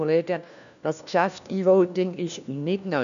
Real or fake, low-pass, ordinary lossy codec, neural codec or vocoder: fake; 7.2 kHz; none; codec, 16 kHz, 1 kbps, FunCodec, trained on LibriTTS, 50 frames a second